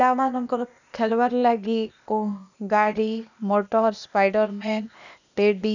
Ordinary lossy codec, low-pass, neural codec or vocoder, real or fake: none; 7.2 kHz; codec, 16 kHz, 0.8 kbps, ZipCodec; fake